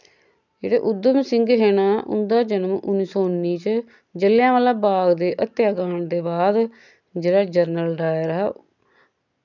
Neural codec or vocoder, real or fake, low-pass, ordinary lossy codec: none; real; 7.2 kHz; none